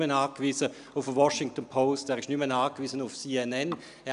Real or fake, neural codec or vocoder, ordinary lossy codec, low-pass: real; none; none; 10.8 kHz